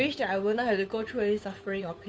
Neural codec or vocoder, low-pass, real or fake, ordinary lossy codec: codec, 16 kHz, 8 kbps, FunCodec, trained on Chinese and English, 25 frames a second; none; fake; none